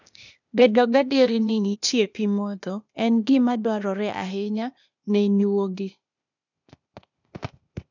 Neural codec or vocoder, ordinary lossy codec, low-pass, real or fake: codec, 16 kHz, 0.8 kbps, ZipCodec; none; 7.2 kHz; fake